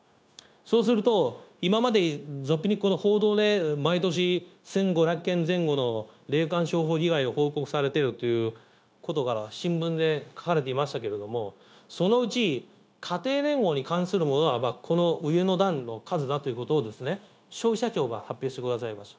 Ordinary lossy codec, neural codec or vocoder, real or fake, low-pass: none; codec, 16 kHz, 0.9 kbps, LongCat-Audio-Codec; fake; none